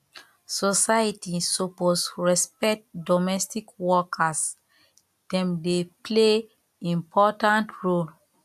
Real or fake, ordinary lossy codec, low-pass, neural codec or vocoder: real; AAC, 96 kbps; 14.4 kHz; none